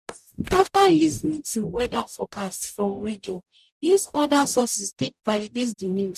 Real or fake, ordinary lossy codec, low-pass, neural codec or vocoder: fake; none; 14.4 kHz; codec, 44.1 kHz, 0.9 kbps, DAC